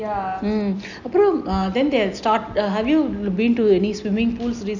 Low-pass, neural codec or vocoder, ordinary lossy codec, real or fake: 7.2 kHz; none; none; real